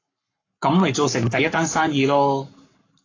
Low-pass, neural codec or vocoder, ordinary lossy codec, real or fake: 7.2 kHz; codec, 44.1 kHz, 7.8 kbps, Pupu-Codec; AAC, 32 kbps; fake